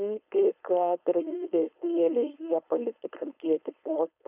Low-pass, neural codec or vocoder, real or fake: 3.6 kHz; codec, 16 kHz, 4.8 kbps, FACodec; fake